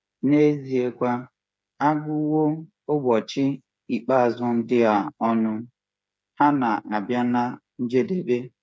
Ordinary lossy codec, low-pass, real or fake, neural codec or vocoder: none; none; fake; codec, 16 kHz, 8 kbps, FreqCodec, smaller model